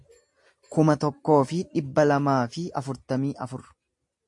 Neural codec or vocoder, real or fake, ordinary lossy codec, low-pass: none; real; MP3, 48 kbps; 10.8 kHz